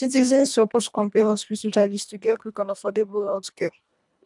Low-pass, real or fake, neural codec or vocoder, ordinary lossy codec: 10.8 kHz; fake; codec, 24 kHz, 1.5 kbps, HILCodec; none